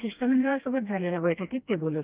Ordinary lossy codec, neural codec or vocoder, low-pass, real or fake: Opus, 32 kbps; codec, 16 kHz, 1 kbps, FreqCodec, smaller model; 3.6 kHz; fake